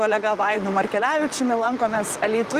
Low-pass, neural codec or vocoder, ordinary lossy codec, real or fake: 14.4 kHz; vocoder, 44.1 kHz, 128 mel bands, Pupu-Vocoder; Opus, 32 kbps; fake